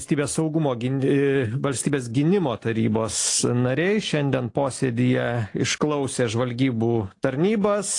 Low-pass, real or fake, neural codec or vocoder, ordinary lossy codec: 10.8 kHz; real; none; AAC, 48 kbps